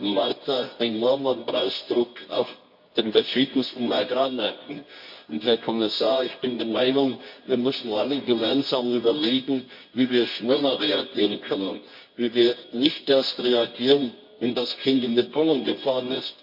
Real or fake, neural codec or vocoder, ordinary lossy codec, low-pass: fake; codec, 24 kHz, 0.9 kbps, WavTokenizer, medium music audio release; MP3, 32 kbps; 5.4 kHz